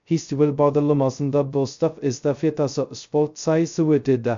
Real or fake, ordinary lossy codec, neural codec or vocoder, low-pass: fake; MP3, 64 kbps; codec, 16 kHz, 0.2 kbps, FocalCodec; 7.2 kHz